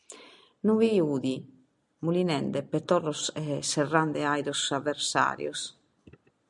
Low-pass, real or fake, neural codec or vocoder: 10.8 kHz; real; none